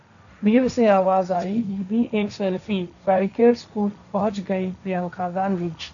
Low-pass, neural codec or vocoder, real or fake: 7.2 kHz; codec, 16 kHz, 1.1 kbps, Voila-Tokenizer; fake